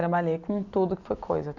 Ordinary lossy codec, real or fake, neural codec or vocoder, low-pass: none; real; none; 7.2 kHz